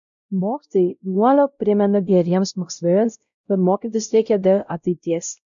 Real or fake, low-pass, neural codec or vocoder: fake; 7.2 kHz; codec, 16 kHz, 0.5 kbps, X-Codec, WavLM features, trained on Multilingual LibriSpeech